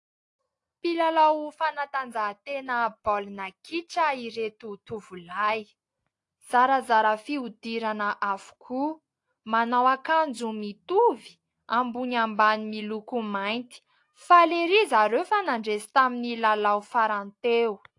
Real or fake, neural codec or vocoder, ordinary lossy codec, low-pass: real; none; AAC, 48 kbps; 10.8 kHz